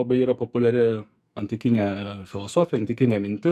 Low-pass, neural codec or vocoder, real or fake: 14.4 kHz; codec, 44.1 kHz, 2.6 kbps, SNAC; fake